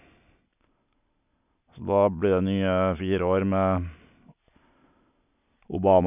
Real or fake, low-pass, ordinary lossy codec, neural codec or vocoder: real; 3.6 kHz; none; none